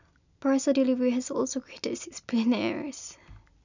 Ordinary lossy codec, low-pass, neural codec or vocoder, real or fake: none; 7.2 kHz; none; real